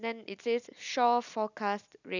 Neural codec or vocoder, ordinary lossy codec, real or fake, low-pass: none; none; real; 7.2 kHz